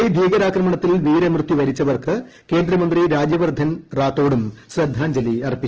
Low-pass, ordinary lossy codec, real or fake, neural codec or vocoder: 7.2 kHz; Opus, 16 kbps; real; none